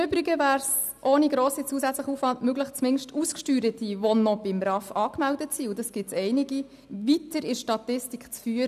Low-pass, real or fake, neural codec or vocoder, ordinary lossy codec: 14.4 kHz; real; none; none